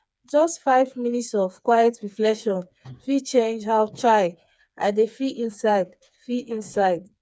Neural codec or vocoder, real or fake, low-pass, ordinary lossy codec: codec, 16 kHz, 4 kbps, FreqCodec, smaller model; fake; none; none